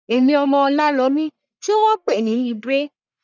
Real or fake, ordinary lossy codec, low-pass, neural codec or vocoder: fake; none; 7.2 kHz; codec, 44.1 kHz, 1.7 kbps, Pupu-Codec